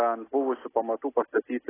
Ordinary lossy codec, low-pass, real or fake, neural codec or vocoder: AAC, 16 kbps; 3.6 kHz; real; none